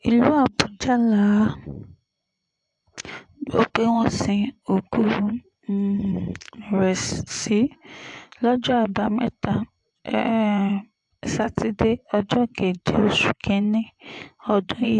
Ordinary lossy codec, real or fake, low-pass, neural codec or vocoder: AAC, 64 kbps; fake; 10.8 kHz; vocoder, 24 kHz, 100 mel bands, Vocos